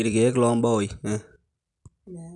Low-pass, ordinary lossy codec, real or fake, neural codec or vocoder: 10.8 kHz; none; real; none